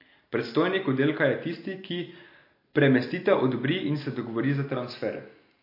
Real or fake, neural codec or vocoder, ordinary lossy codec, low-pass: real; none; MP3, 32 kbps; 5.4 kHz